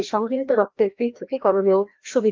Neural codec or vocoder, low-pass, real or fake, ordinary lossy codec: codec, 16 kHz, 1 kbps, FreqCodec, larger model; 7.2 kHz; fake; Opus, 32 kbps